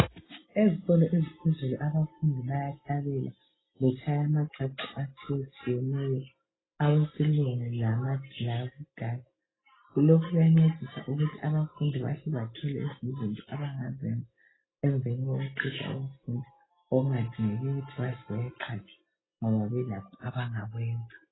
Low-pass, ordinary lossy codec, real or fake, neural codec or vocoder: 7.2 kHz; AAC, 16 kbps; real; none